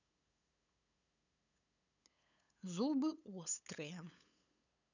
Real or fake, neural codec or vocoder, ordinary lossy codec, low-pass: fake; codec, 16 kHz, 8 kbps, FunCodec, trained on LibriTTS, 25 frames a second; none; 7.2 kHz